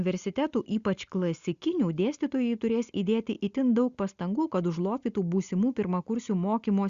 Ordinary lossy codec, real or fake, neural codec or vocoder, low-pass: Opus, 64 kbps; real; none; 7.2 kHz